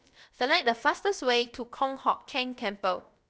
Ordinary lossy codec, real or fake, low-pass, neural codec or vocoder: none; fake; none; codec, 16 kHz, about 1 kbps, DyCAST, with the encoder's durations